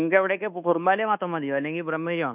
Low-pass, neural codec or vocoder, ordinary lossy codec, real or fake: 3.6 kHz; codec, 24 kHz, 1.2 kbps, DualCodec; none; fake